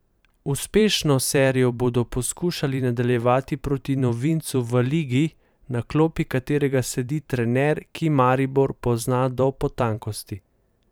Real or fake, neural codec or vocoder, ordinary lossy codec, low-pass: fake; vocoder, 44.1 kHz, 128 mel bands every 512 samples, BigVGAN v2; none; none